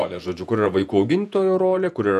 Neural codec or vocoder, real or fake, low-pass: vocoder, 48 kHz, 128 mel bands, Vocos; fake; 14.4 kHz